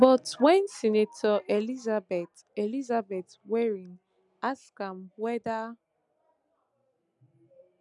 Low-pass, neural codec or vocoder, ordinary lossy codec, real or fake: 10.8 kHz; none; none; real